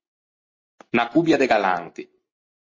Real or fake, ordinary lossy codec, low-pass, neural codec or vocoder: real; MP3, 32 kbps; 7.2 kHz; none